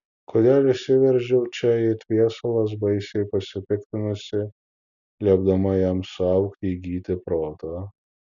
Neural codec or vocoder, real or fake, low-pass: none; real; 7.2 kHz